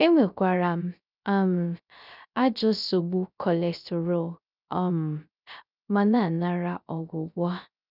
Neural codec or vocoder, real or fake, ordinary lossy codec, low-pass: codec, 16 kHz, 0.3 kbps, FocalCodec; fake; none; 5.4 kHz